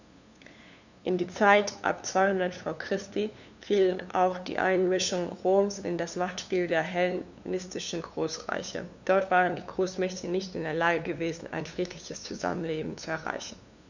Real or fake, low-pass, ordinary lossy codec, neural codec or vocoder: fake; 7.2 kHz; none; codec, 16 kHz, 2 kbps, FunCodec, trained on LibriTTS, 25 frames a second